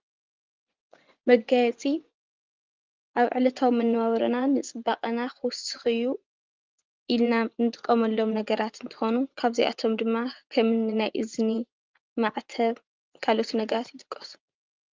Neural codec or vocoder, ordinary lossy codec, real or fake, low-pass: vocoder, 24 kHz, 100 mel bands, Vocos; Opus, 24 kbps; fake; 7.2 kHz